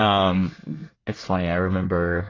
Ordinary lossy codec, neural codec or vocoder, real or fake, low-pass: AAC, 32 kbps; codec, 24 kHz, 1 kbps, SNAC; fake; 7.2 kHz